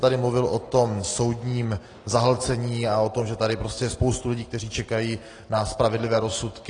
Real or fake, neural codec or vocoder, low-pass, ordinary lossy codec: real; none; 9.9 kHz; AAC, 32 kbps